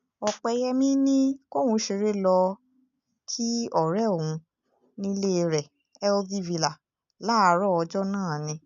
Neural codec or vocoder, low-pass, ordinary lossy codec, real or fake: none; 7.2 kHz; none; real